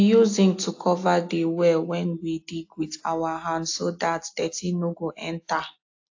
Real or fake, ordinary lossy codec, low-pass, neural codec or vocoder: real; AAC, 48 kbps; 7.2 kHz; none